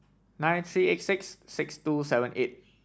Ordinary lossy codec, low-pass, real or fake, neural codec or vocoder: none; none; real; none